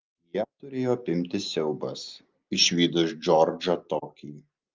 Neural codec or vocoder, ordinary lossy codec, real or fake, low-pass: none; Opus, 32 kbps; real; 7.2 kHz